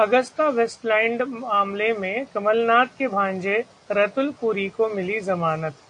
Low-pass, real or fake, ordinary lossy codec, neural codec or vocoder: 9.9 kHz; real; AAC, 64 kbps; none